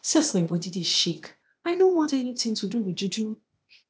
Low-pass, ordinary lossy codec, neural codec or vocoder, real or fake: none; none; codec, 16 kHz, 0.8 kbps, ZipCodec; fake